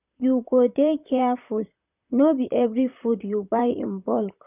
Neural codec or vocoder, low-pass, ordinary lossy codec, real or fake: vocoder, 44.1 kHz, 128 mel bands, Pupu-Vocoder; 3.6 kHz; none; fake